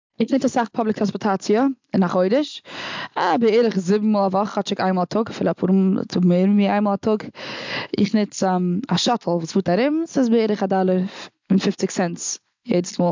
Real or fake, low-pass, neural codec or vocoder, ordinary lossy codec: real; 7.2 kHz; none; MP3, 64 kbps